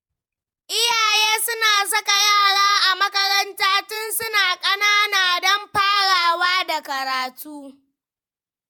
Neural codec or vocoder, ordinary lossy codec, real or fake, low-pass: vocoder, 48 kHz, 128 mel bands, Vocos; none; fake; 19.8 kHz